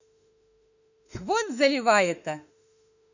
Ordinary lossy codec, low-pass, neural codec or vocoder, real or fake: none; 7.2 kHz; autoencoder, 48 kHz, 32 numbers a frame, DAC-VAE, trained on Japanese speech; fake